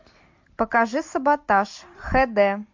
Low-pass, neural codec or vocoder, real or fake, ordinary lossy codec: 7.2 kHz; none; real; MP3, 48 kbps